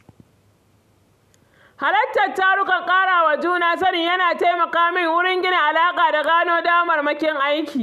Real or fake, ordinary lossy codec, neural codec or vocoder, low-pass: real; none; none; 14.4 kHz